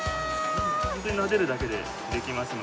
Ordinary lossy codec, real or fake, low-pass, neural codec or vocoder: none; real; none; none